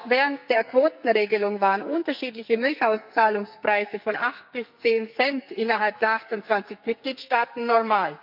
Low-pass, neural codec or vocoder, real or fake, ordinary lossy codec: 5.4 kHz; codec, 44.1 kHz, 2.6 kbps, SNAC; fake; none